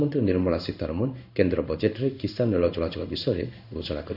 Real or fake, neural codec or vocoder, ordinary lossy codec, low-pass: fake; codec, 16 kHz in and 24 kHz out, 1 kbps, XY-Tokenizer; none; 5.4 kHz